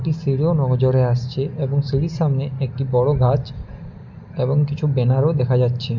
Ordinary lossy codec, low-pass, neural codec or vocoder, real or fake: none; 7.2 kHz; none; real